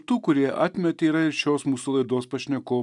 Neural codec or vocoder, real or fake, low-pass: none; real; 10.8 kHz